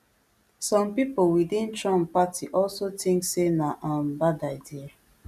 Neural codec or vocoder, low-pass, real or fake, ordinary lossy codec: none; 14.4 kHz; real; none